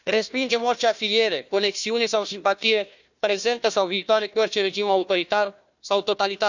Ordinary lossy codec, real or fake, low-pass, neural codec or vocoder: none; fake; 7.2 kHz; codec, 16 kHz, 1 kbps, FunCodec, trained on Chinese and English, 50 frames a second